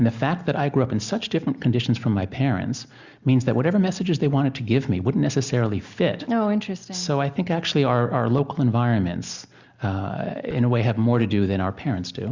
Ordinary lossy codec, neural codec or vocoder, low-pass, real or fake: Opus, 64 kbps; none; 7.2 kHz; real